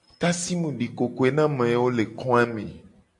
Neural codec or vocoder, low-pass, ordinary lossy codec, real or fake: none; 10.8 kHz; MP3, 48 kbps; real